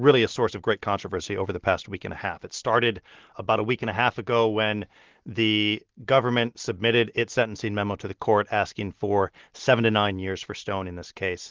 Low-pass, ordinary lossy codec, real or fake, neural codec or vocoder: 7.2 kHz; Opus, 16 kbps; real; none